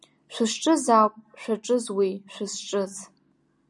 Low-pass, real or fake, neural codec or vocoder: 10.8 kHz; real; none